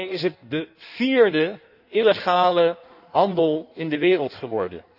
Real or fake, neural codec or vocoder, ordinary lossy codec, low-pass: fake; codec, 16 kHz in and 24 kHz out, 1.1 kbps, FireRedTTS-2 codec; none; 5.4 kHz